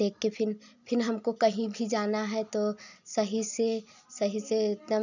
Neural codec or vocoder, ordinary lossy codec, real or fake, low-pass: none; none; real; 7.2 kHz